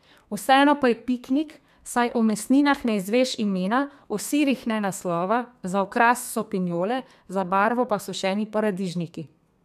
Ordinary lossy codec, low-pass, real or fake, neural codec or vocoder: none; 14.4 kHz; fake; codec, 32 kHz, 1.9 kbps, SNAC